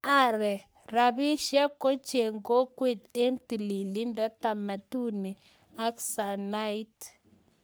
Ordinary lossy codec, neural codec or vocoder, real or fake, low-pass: none; codec, 44.1 kHz, 3.4 kbps, Pupu-Codec; fake; none